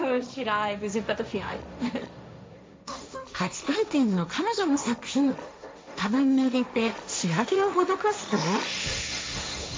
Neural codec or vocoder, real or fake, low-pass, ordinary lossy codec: codec, 16 kHz, 1.1 kbps, Voila-Tokenizer; fake; none; none